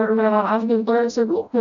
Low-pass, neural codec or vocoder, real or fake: 7.2 kHz; codec, 16 kHz, 0.5 kbps, FreqCodec, smaller model; fake